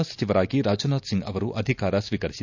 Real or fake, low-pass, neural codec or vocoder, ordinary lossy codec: real; 7.2 kHz; none; none